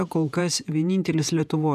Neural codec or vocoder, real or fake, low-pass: vocoder, 48 kHz, 128 mel bands, Vocos; fake; 14.4 kHz